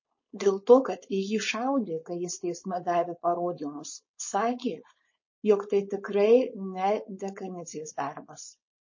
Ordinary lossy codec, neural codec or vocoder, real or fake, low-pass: MP3, 32 kbps; codec, 16 kHz, 4.8 kbps, FACodec; fake; 7.2 kHz